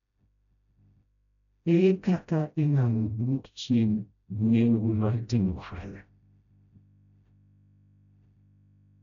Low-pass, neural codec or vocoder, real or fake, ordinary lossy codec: 7.2 kHz; codec, 16 kHz, 0.5 kbps, FreqCodec, smaller model; fake; none